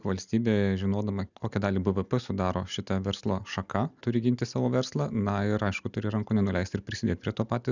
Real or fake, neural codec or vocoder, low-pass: real; none; 7.2 kHz